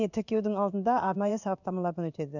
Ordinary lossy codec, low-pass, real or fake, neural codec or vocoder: none; 7.2 kHz; fake; codec, 16 kHz in and 24 kHz out, 1 kbps, XY-Tokenizer